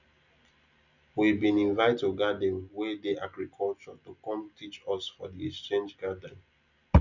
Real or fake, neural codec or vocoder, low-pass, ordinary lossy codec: real; none; 7.2 kHz; none